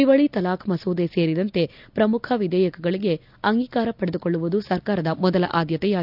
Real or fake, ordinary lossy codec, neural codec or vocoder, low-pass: real; none; none; 5.4 kHz